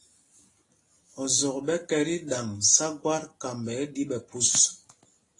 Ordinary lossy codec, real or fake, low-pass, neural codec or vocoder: AAC, 32 kbps; real; 10.8 kHz; none